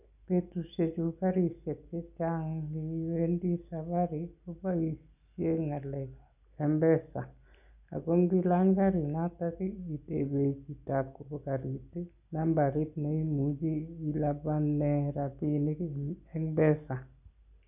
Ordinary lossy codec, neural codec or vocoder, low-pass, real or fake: none; none; 3.6 kHz; real